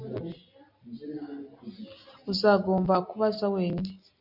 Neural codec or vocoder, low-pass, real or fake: none; 5.4 kHz; real